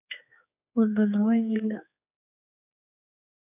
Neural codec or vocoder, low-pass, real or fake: codec, 16 kHz, 4 kbps, X-Codec, HuBERT features, trained on general audio; 3.6 kHz; fake